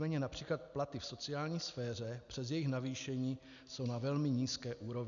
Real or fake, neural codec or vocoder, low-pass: real; none; 7.2 kHz